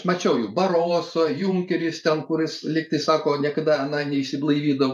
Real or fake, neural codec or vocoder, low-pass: fake; vocoder, 44.1 kHz, 128 mel bands every 512 samples, BigVGAN v2; 14.4 kHz